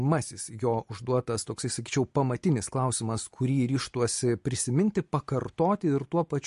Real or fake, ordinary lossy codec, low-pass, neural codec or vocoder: real; MP3, 48 kbps; 14.4 kHz; none